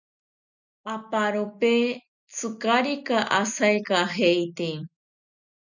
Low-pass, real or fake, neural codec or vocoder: 7.2 kHz; real; none